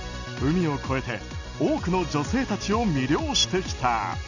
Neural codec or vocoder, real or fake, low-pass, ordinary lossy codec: none; real; 7.2 kHz; none